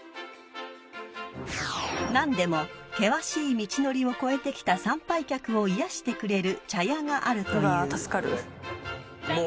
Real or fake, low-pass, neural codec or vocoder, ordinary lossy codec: real; none; none; none